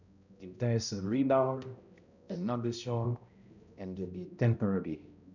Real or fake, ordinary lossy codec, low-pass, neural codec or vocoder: fake; none; 7.2 kHz; codec, 16 kHz, 0.5 kbps, X-Codec, HuBERT features, trained on balanced general audio